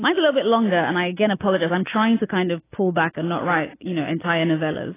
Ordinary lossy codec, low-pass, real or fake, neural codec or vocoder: AAC, 16 kbps; 3.6 kHz; real; none